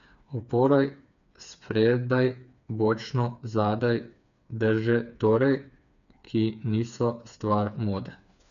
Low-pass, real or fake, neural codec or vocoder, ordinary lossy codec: 7.2 kHz; fake; codec, 16 kHz, 4 kbps, FreqCodec, smaller model; none